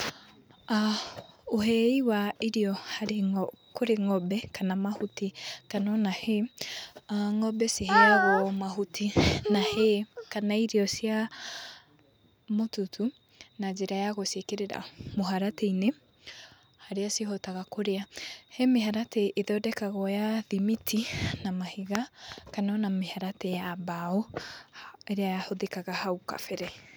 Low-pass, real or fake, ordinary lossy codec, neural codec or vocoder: none; real; none; none